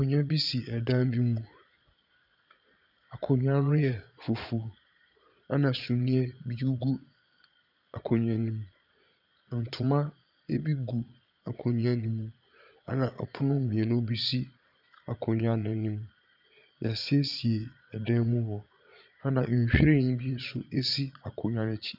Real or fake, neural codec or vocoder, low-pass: fake; vocoder, 22.05 kHz, 80 mel bands, Vocos; 5.4 kHz